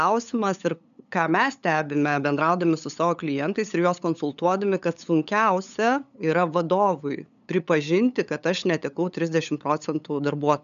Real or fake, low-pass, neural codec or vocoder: fake; 7.2 kHz; codec, 16 kHz, 8 kbps, FunCodec, trained on LibriTTS, 25 frames a second